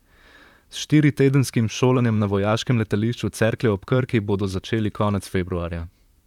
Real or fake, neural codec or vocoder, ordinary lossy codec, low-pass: fake; vocoder, 44.1 kHz, 128 mel bands, Pupu-Vocoder; none; 19.8 kHz